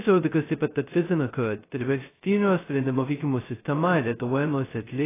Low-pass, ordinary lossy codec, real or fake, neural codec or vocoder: 3.6 kHz; AAC, 16 kbps; fake; codec, 16 kHz, 0.2 kbps, FocalCodec